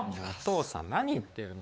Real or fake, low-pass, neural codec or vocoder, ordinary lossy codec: fake; none; codec, 16 kHz, 4 kbps, X-Codec, WavLM features, trained on Multilingual LibriSpeech; none